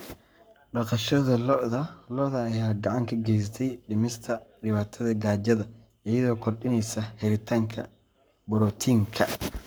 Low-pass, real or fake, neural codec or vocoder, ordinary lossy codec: none; fake; codec, 44.1 kHz, 7.8 kbps, Pupu-Codec; none